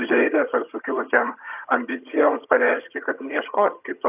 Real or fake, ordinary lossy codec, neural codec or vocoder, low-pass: fake; AAC, 32 kbps; vocoder, 22.05 kHz, 80 mel bands, HiFi-GAN; 3.6 kHz